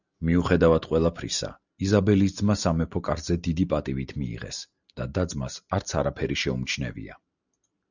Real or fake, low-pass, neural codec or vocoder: real; 7.2 kHz; none